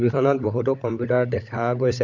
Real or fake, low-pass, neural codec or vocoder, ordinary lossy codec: fake; 7.2 kHz; codec, 16 kHz, 16 kbps, FunCodec, trained on LibriTTS, 50 frames a second; none